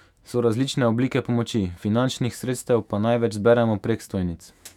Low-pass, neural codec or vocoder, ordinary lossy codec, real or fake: 19.8 kHz; autoencoder, 48 kHz, 128 numbers a frame, DAC-VAE, trained on Japanese speech; none; fake